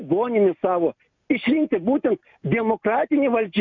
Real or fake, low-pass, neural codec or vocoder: real; 7.2 kHz; none